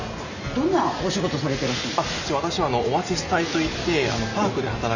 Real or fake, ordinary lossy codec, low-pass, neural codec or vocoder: real; none; 7.2 kHz; none